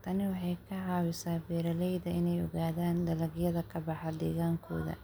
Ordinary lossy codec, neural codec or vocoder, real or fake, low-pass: none; none; real; none